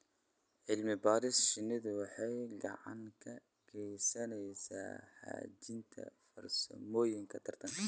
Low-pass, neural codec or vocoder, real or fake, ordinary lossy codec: none; none; real; none